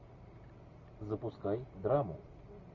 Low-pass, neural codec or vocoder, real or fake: 7.2 kHz; none; real